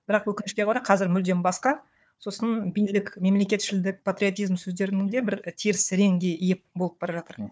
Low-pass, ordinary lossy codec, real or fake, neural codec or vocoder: none; none; fake; codec, 16 kHz, 4 kbps, FunCodec, trained on Chinese and English, 50 frames a second